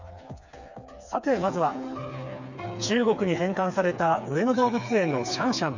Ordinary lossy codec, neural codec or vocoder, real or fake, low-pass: none; codec, 16 kHz, 4 kbps, FreqCodec, smaller model; fake; 7.2 kHz